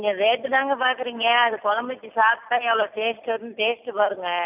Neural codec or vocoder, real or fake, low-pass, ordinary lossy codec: none; real; 3.6 kHz; none